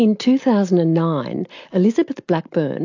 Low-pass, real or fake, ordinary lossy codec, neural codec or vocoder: 7.2 kHz; real; AAC, 48 kbps; none